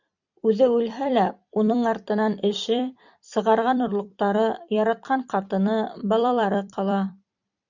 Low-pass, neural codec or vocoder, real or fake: 7.2 kHz; vocoder, 44.1 kHz, 128 mel bands every 256 samples, BigVGAN v2; fake